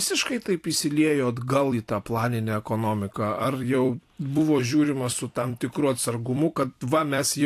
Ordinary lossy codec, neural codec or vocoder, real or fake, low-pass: AAC, 64 kbps; vocoder, 44.1 kHz, 128 mel bands every 256 samples, BigVGAN v2; fake; 14.4 kHz